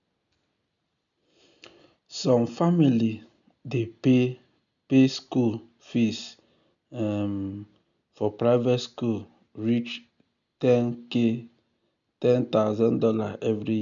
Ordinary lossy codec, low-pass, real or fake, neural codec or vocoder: none; 7.2 kHz; real; none